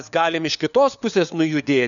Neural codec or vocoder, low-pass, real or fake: codec, 16 kHz, 4 kbps, FunCodec, trained on LibriTTS, 50 frames a second; 7.2 kHz; fake